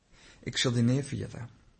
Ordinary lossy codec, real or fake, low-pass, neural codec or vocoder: MP3, 32 kbps; real; 10.8 kHz; none